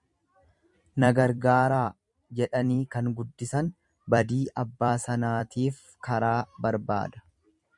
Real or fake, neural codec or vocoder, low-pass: fake; vocoder, 44.1 kHz, 128 mel bands every 256 samples, BigVGAN v2; 10.8 kHz